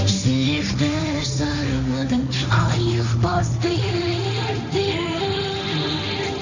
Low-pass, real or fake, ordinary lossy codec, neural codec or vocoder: 7.2 kHz; fake; none; codec, 16 kHz, 1.1 kbps, Voila-Tokenizer